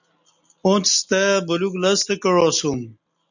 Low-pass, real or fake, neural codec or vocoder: 7.2 kHz; real; none